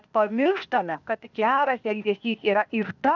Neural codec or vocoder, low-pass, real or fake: codec, 16 kHz, 0.8 kbps, ZipCodec; 7.2 kHz; fake